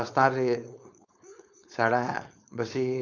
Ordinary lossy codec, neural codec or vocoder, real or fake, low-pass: none; codec, 16 kHz, 4.8 kbps, FACodec; fake; 7.2 kHz